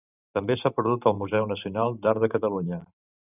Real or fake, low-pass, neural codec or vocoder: fake; 3.6 kHz; vocoder, 44.1 kHz, 128 mel bands every 512 samples, BigVGAN v2